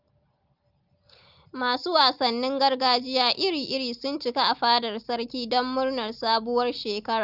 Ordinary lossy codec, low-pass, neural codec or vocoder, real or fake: Opus, 24 kbps; 5.4 kHz; none; real